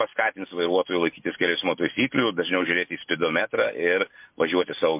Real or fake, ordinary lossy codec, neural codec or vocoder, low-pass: real; MP3, 32 kbps; none; 3.6 kHz